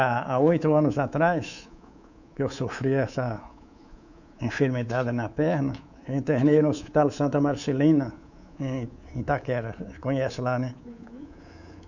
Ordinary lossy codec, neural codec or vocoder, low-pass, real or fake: none; codec, 24 kHz, 3.1 kbps, DualCodec; 7.2 kHz; fake